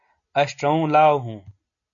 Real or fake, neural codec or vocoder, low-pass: real; none; 7.2 kHz